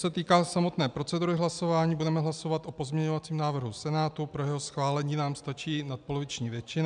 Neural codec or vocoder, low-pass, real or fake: none; 9.9 kHz; real